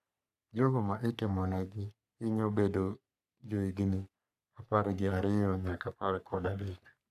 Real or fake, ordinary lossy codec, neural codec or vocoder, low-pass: fake; none; codec, 44.1 kHz, 3.4 kbps, Pupu-Codec; 14.4 kHz